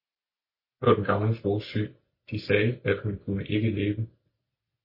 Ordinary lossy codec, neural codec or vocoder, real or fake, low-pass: MP3, 24 kbps; none; real; 5.4 kHz